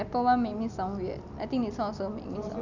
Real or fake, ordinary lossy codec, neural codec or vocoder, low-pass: real; none; none; 7.2 kHz